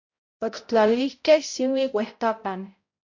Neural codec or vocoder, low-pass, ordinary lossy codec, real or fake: codec, 16 kHz, 0.5 kbps, X-Codec, HuBERT features, trained on balanced general audio; 7.2 kHz; MP3, 48 kbps; fake